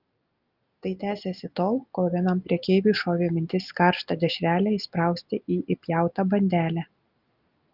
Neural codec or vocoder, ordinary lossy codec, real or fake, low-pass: none; Opus, 32 kbps; real; 5.4 kHz